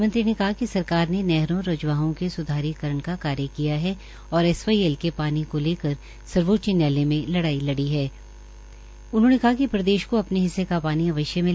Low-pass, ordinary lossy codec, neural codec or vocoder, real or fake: none; none; none; real